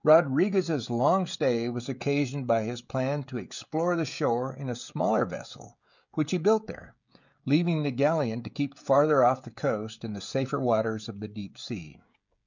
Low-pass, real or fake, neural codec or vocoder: 7.2 kHz; fake; codec, 16 kHz, 16 kbps, FreqCodec, smaller model